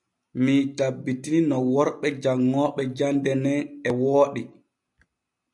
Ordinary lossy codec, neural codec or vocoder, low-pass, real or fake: MP3, 96 kbps; none; 10.8 kHz; real